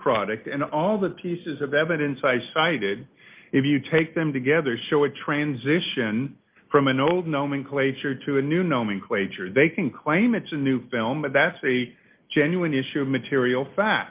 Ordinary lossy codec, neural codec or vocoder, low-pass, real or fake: Opus, 32 kbps; none; 3.6 kHz; real